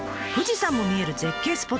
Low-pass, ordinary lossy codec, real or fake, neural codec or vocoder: none; none; real; none